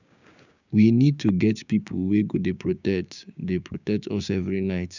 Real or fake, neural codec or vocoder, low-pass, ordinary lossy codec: fake; codec, 16 kHz, 6 kbps, DAC; 7.2 kHz; none